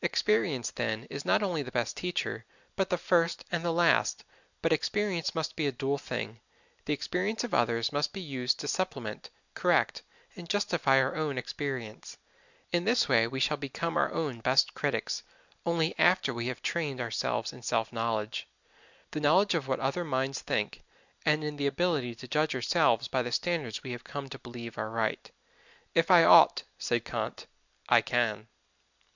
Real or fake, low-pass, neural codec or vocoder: real; 7.2 kHz; none